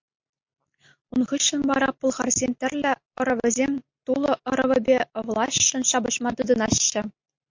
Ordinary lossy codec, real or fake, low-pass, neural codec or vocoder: MP3, 48 kbps; real; 7.2 kHz; none